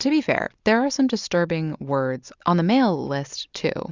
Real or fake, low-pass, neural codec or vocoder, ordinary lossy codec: real; 7.2 kHz; none; Opus, 64 kbps